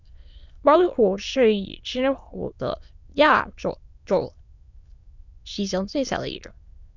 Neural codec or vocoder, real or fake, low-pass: autoencoder, 22.05 kHz, a latent of 192 numbers a frame, VITS, trained on many speakers; fake; 7.2 kHz